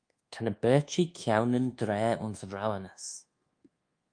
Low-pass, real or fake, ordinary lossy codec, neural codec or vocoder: 9.9 kHz; fake; Opus, 32 kbps; codec, 24 kHz, 1.2 kbps, DualCodec